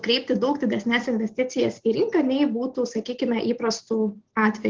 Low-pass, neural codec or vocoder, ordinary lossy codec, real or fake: 7.2 kHz; none; Opus, 16 kbps; real